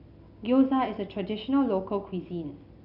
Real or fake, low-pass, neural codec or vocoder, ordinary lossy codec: real; 5.4 kHz; none; none